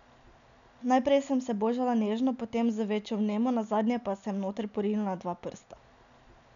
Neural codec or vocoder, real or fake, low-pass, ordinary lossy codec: none; real; 7.2 kHz; none